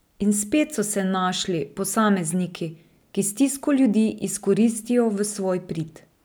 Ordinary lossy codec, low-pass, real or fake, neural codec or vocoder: none; none; real; none